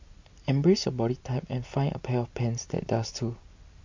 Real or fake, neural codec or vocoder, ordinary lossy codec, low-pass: real; none; MP3, 48 kbps; 7.2 kHz